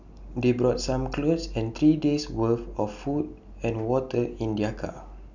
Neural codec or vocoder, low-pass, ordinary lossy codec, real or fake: none; 7.2 kHz; none; real